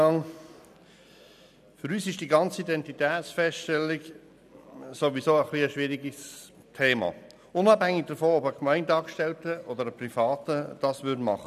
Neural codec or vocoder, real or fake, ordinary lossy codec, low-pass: none; real; none; 14.4 kHz